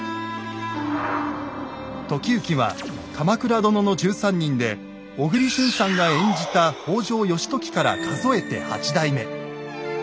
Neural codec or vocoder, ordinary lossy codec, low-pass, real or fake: none; none; none; real